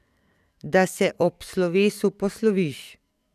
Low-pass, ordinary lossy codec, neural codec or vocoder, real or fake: 14.4 kHz; none; codec, 44.1 kHz, 7.8 kbps, DAC; fake